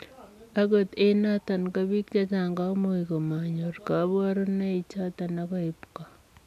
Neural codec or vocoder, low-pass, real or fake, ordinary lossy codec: none; 14.4 kHz; real; none